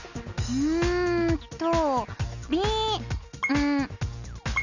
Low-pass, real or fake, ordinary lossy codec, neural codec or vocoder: 7.2 kHz; real; none; none